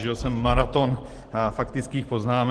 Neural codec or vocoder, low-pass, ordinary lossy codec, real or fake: none; 10.8 kHz; Opus, 16 kbps; real